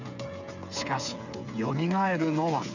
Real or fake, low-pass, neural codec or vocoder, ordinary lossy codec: fake; 7.2 kHz; codec, 16 kHz, 8 kbps, FreqCodec, smaller model; none